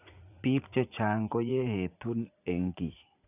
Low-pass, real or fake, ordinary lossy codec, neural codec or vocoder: 3.6 kHz; fake; none; vocoder, 44.1 kHz, 80 mel bands, Vocos